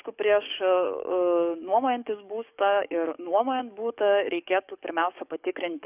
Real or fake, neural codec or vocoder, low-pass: fake; codec, 44.1 kHz, 7.8 kbps, DAC; 3.6 kHz